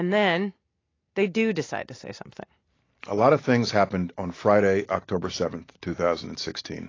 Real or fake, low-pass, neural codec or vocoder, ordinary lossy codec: real; 7.2 kHz; none; AAC, 32 kbps